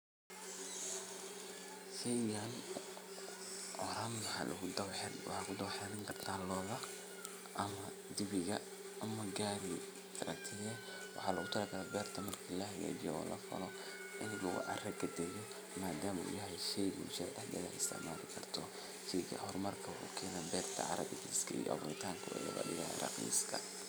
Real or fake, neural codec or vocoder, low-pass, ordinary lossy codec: real; none; none; none